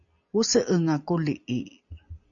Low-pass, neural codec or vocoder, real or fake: 7.2 kHz; none; real